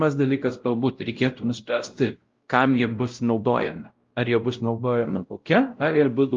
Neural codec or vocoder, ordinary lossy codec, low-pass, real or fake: codec, 16 kHz, 0.5 kbps, X-Codec, WavLM features, trained on Multilingual LibriSpeech; Opus, 32 kbps; 7.2 kHz; fake